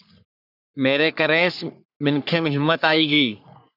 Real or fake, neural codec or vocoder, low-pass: fake; codec, 44.1 kHz, 3.4 kbps, Pupu-Codec; 5.4 kHz